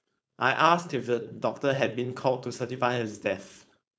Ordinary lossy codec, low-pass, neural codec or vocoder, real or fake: none; none; codec, 16 kHz, 4.8 kbps, FACodec; fake